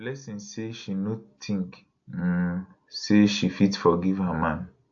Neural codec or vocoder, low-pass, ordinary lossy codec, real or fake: none; 7.2 kHz; AAC, 64 kbps; real